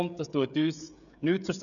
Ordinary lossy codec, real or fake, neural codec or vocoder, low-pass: none; fake; codec, 16 kHz, 16 kbps, FreqCodec, smaller model; 7.2 kHz